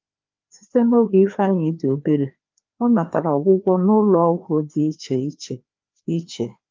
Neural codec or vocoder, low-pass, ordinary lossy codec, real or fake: codec, 16 kHz, 2 kbps, FreqCodec, larger model; 7.2 kHz; Opus, 24 kbps; fake